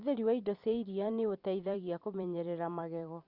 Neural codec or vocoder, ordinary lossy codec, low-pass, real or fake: vocoder, 44.1 kHz, 128 mel bands every 256 samples, BigVGAN v2; Opus, 64 kbps; 5.4 kHz; fake